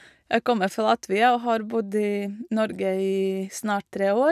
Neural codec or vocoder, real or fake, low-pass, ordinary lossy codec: none; real; 14.4 kHz; none